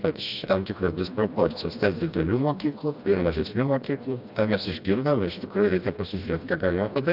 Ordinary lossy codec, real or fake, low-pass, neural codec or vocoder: AAC, 48 kbps; fake; 5.4 kHz; codec, 16 kHz, 1 kbps, FreqCodec, smaller model